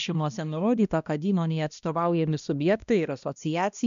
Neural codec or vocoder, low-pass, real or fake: codec, 16 kHz, 1 kbps, X-Codec, HuBERT features, trained on balanced general audio; 7.2 kHz; fake